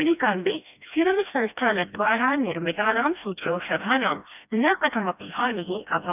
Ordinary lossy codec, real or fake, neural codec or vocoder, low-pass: none; fake; codec, 16 kHz, 1 kbps, FreqCodec, smaller model; 3.6 kHz